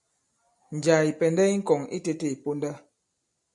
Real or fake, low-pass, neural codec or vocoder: real; 10.8 kHz; none